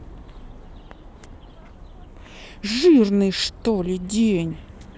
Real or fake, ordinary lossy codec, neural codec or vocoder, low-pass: real; none; none; none